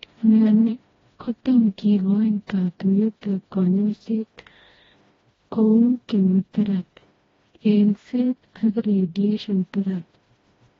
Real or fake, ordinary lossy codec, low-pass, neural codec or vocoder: fake; AAC, 24 kbps; 7.2 kHz; codec, 16 kHz, 1 kbps, FreqCodec, smaller model